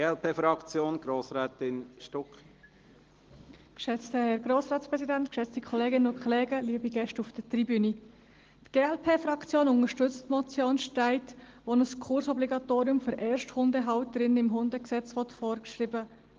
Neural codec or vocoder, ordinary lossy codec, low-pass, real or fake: none; Opus, 16 kbps; 7.2 kHz; real